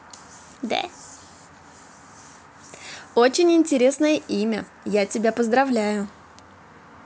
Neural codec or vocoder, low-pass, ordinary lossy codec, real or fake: none; none; none; real